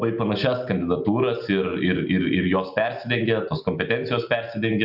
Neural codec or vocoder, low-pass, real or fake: none; 5.4 kHz; real